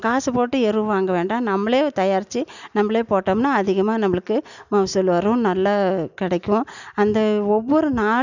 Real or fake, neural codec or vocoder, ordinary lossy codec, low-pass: real; none; none; 7.2 kHz